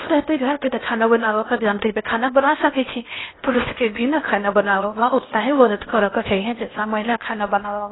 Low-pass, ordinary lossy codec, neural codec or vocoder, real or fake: 7.2 kHz; AAC, 16 kbps; codec, 16 kHz in and 24 kHz out, 0.8 kbps, FocalCodec, streaming, 65536 codes; fake